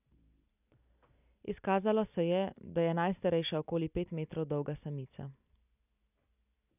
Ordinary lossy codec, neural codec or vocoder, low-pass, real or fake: none; none; 3.6 kHz; real